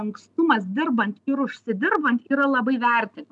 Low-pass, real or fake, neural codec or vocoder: 10.8 kHz; real; none